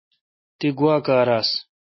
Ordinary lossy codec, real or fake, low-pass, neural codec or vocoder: MP3, 24 kbps; real; 7.2 kHz; none